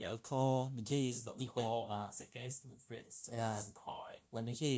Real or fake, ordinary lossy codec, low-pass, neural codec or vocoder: fake; none; none; codec, 16 kHz, 0.5 kbps, FunCodec, trained on LibriTTS, 25 frames a second